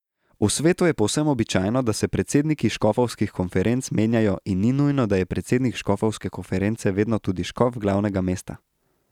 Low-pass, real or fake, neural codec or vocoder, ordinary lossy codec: 19.8 kHz; real; none; none